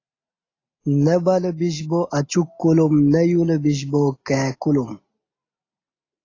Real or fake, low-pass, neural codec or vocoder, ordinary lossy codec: real; 7.2 kHz; none; AAC, 32 kbps